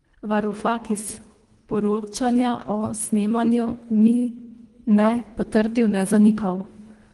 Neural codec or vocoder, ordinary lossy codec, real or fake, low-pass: codec, 24 kHz, 1.5 kbps, HILCodec; Opus, 24 kbps; fake; 10.8 kHz